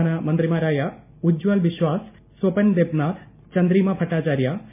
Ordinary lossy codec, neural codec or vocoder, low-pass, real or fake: MP3, 24 kbps; none; 3.6 kHz; real